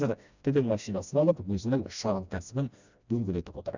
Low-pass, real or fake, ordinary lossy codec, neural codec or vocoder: 7.2 kHz; fake; none; codec, 16 kHz, 1 kbps, FreqCodec, smaller model